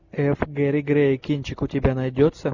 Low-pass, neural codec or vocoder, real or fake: 7.2 kHz; none; real